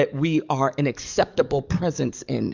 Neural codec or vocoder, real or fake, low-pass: codec, 44.1 kHz, 7.8 kbps, DAC; fake; 7.2 kHz